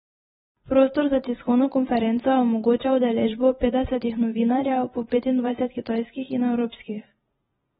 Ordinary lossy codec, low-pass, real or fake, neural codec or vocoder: AAC, 16 kbps; 19.8 kHz; fake; vocoder, 44.1 kHz, 128 mel bands every 512 samples, BigVGAN v2